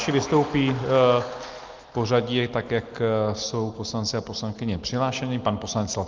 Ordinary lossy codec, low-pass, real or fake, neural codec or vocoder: Opus, 24 kbps; 7.2 kHz; real; none